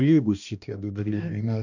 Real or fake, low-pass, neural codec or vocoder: fake; 7.2 kHz; codec, 16 kHz, 1 kbps, X-Codec, HuBERT features, trained on balanced general audio